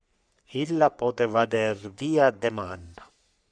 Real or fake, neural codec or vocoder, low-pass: fake; codec, 44.1 kHz, 3.4 kbps, Pupu-Codec; 9.9 kHz